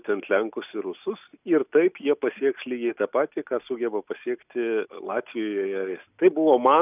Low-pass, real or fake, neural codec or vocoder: 3.6 kHz; real; none